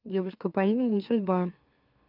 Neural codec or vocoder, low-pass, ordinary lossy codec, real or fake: autoencoder, 44.1 kHz, a latent of 192 numbers a frame, MeloTTS; 5.4 kHz; Opus, 32 kbps; fake